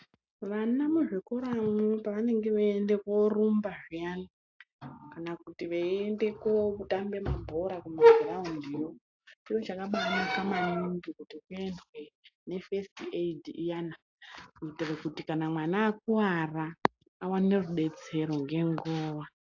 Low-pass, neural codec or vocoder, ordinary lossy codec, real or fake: 7.2 kHz; none; AAC, 48 kbps; real